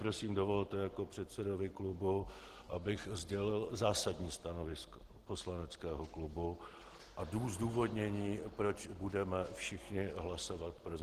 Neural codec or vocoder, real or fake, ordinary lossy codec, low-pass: none; real; Opus, 16 kbps; 14.4 kHz